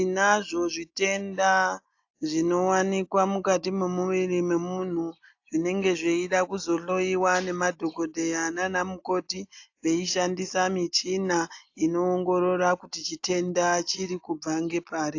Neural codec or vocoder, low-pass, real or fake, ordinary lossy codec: none; 7.2 kHz; real; AAC, 48 kbps